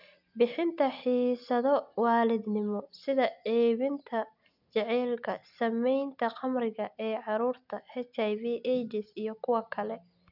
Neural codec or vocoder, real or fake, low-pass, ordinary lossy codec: none; real; 5.4 kHz; none